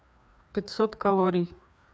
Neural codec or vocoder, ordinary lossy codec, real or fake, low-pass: codec, 16 kHz, 2 kbps, FreqCodec, larger model; none; fake; none